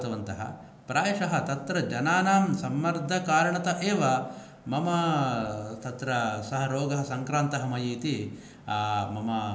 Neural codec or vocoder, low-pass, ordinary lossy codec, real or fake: none; none; none; real